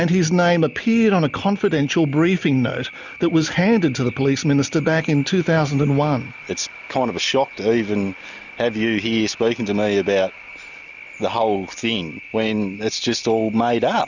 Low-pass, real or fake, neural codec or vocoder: 7.2 kHz; real; none